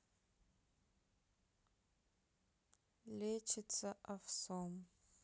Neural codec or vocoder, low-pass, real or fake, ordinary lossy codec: none; none; real; none